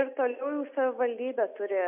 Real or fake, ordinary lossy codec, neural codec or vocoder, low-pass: real; MP3, 32 kbps; none; 3.6 kHz